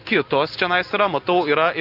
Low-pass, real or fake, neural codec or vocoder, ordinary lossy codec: 5.4 kHz; real; none; Opus, 24 kbps